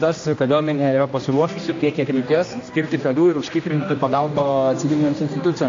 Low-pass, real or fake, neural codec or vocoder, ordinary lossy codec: 7.2 kHz; fake; codec, 16 kHz, 1 kbps, X-Codec, HuBERT features, trained on general audio; AAC, 48 kbps